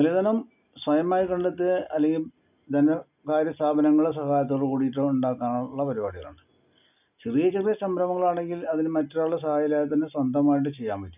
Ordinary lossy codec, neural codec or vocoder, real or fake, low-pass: none; none; real; 3.6 kHz